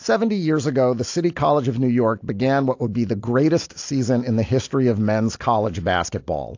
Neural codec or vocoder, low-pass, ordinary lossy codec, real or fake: none; 7.2 kHz; AAC, 48 kbps; real